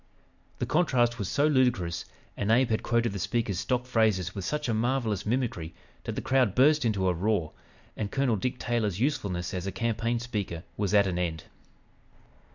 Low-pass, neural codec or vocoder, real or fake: 7.2 kHz; none; real